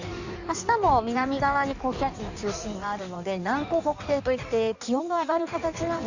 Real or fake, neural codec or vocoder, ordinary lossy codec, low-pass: fake; codec, 16 kHz in and 24 kHz out, 1.1 kbps, FireRedTTS-2 codec; none; 7.2 kHz